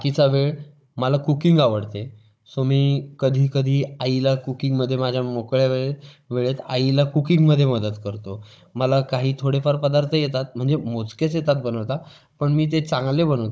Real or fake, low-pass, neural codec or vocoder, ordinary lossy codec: fake; none; codec, 16 kHz, 16 kbps, FunCodec, trained on Chinese and English, 50 frames a second; none